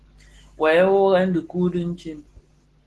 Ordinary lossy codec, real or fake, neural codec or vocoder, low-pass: Opus, 16 kbps; real; none; 10.8 kHz